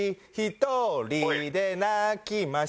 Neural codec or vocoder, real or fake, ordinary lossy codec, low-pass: none; real; none; none